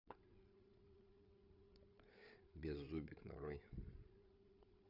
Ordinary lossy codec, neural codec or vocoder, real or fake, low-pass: none; codec, 16 kHz, 8 kbps, FreqCodec, larger model; fake; 5.4 kHz